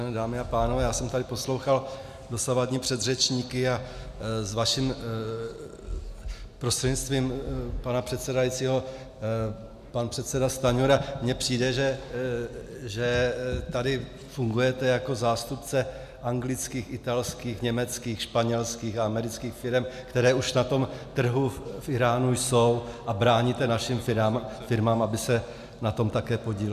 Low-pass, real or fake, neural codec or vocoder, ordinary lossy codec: 14.4 kHz; real; none; MP3, 96 kbps